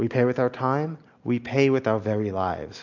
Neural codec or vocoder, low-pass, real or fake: none; 7.2 kHz; real